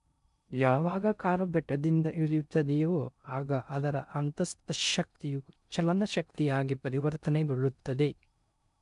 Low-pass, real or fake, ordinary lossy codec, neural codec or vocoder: 10.8 kHz; fake; none; codec, 16 kHz in and 24 kHz out, 0.6 kbps, FocalCodec, streaming, 4096 codes